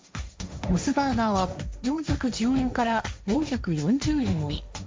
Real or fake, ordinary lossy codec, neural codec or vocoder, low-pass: fake; none; codec, 16 kHz, 1.1 kbps, Voila-Tokenizer; none